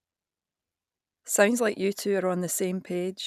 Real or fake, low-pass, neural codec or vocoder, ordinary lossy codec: real; 14.4 kHz; none; none